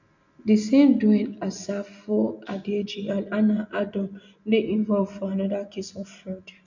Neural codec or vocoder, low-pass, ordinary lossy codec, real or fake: none; 7.2 kHz; none; real